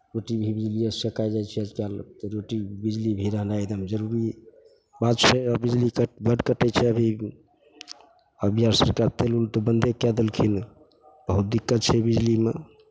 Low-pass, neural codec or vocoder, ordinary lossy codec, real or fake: none; none; none; real